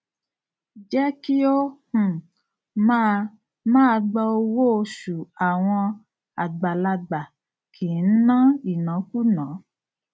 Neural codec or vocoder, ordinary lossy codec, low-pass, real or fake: none; none; none; real